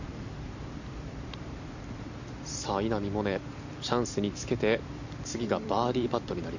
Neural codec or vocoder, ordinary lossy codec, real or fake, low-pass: vocoder, 44.1 kHz, 128 mel bands every 256 samples, BigVGAN v2; none; fake; 7.2 kHz